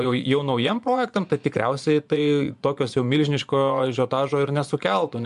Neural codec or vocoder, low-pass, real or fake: vocoder, 24 kHz, 100 mel bands, Vocos; 10.8 kHz; fake